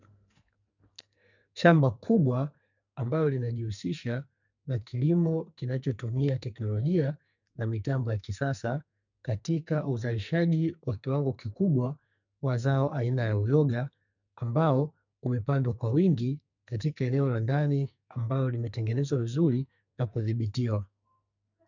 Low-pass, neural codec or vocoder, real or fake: 7.2 kHz; codec, 44.1 kHz, 2.6 kbps, SNAC; fake